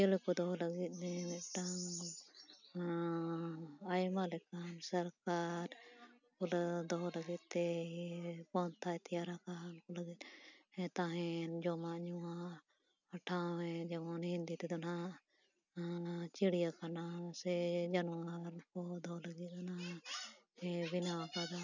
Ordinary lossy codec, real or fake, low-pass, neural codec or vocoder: none; real; 7.2 kHz; none